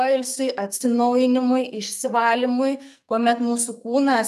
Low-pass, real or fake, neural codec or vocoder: 14.4 kHz; fake; codec, 44.1 kHz, 2.6 kbps, SNAC